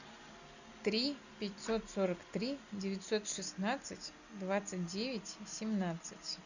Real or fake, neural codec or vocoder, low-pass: real; none; 7.2 kHz